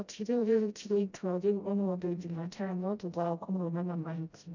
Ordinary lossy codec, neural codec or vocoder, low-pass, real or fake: none; codec, 16 kHz, 0.5 kbps, FreqCodec, smaller model; 7.2 kHz; fake